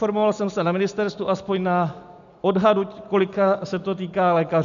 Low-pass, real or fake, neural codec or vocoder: 7.2 kHz; real; none